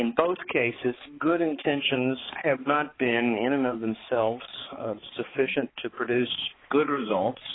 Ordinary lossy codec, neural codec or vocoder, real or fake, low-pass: AAC, 16 kbps; codec, 16 kHz, 4 kbps, X-Codec, HuBERT features, trained on balanced general audio; fake; 7.2 kHz